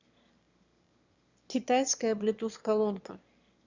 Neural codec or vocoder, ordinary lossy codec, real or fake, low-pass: autoencoder, 22.05 kHz, a latent of 192 numbers a frame, VITS, trained on one speaker; Opus, 64 kbps; fake; 7.2 kHz